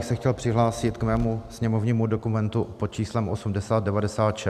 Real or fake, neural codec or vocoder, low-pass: real; none; 14.4 kHz